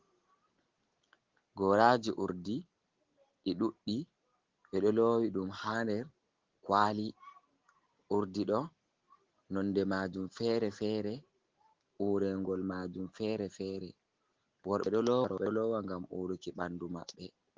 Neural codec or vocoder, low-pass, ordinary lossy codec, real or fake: none; 7.2 kHz; Opus, 16 kbps; real